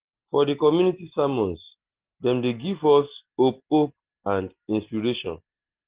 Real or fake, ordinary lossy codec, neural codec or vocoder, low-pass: real; Opus, 16 kbps; none; 3.6 kHz